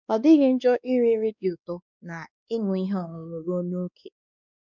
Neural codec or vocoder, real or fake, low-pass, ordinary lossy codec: codec, 16 kHz, 2 kbps, X-Codec, WavLM features, trained on Multilingual LibriSpeech; fake; 7.2 kHz; none